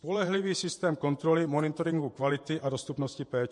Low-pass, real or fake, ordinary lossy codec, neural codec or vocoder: 10.8 kHz; fake; MP3, 48 kbps; vocoder, 48 kHz, 128 mel bands, Vocos